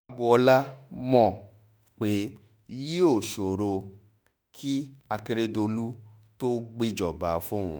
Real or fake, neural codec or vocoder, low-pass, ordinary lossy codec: fake; autoencoder, 48 kHz, 32 numbers a frame, DAC-VAE, trained on Japanese speech; none; none